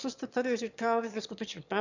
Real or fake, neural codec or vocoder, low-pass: fake; autoencoder, 22.05 kHz, a latent of 192 numbers a frame, VITS, trained on one speaker; 7.2 kHz